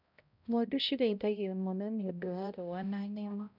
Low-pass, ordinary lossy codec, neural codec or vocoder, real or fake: 5.4 kHz; none; codec, 16 kHz, 0.5 kbps, X-Codec, HuBERT features, trained on balanced general audio; fake